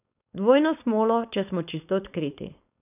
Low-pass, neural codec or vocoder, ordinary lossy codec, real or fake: 3.6 kHz; codec, 16 kHz, 4.8 kbps, FACodec; none; fake